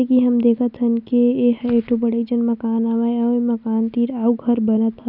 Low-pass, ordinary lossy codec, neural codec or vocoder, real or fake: 5.4 kHz; none; none; real